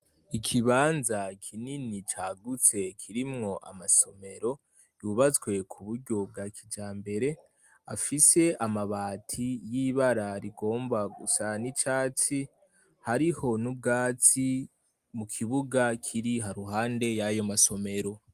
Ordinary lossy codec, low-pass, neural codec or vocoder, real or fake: Opus, 32 kbps; 14.4 kHz; none; real